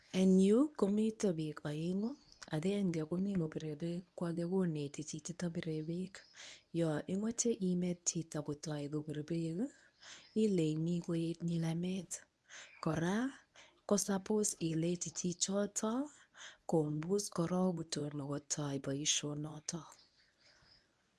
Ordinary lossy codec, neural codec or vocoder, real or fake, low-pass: none; codec, 24 kHz, 0.9 kbps, WavTokenizer, medium speech release version 1; fake; none